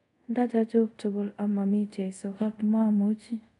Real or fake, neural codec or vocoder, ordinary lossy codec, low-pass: fake; codec, 24 kHz, 0.5 kbps, DualCodec; none; 10.8 kHz